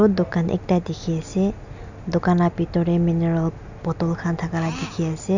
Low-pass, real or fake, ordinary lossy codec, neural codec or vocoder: 7.2 kHz; real; none; none